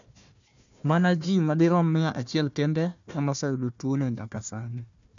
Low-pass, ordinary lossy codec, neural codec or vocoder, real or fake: 7.2 kHz; none; codec, 16 kHz, 1 kbps, FunCodec, trained on Chinese and English, 50 frames a second; fake